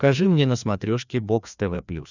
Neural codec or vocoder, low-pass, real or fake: codec, 16 kHz, 2 kbps, FreqCodec, larger model; 7.2 kHz; fake